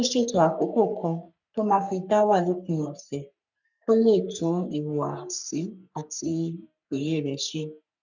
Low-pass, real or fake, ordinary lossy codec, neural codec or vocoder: 7.2 kHz; fake; none; codec, 44.1 kHz, 3.4 kbps, Pupu-Codec